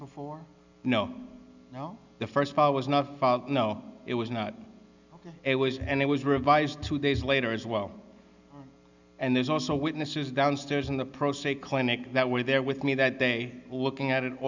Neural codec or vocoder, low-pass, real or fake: none; 7.2 kHz; real